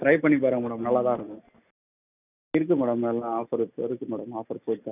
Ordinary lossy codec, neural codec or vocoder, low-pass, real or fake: none; none; 3.6 kHz; real